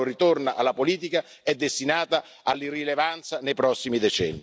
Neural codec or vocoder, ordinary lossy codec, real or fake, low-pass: none; none; real; none